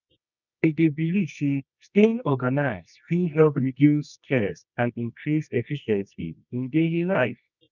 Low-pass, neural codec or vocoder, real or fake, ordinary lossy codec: 7.2 kHz; codec, 24 kHz, 0.9 kbps, WavTokenizer, medium music audio release; fake; none